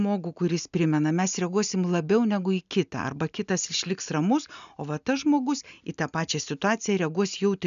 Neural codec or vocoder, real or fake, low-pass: none; real; 7.2 kHz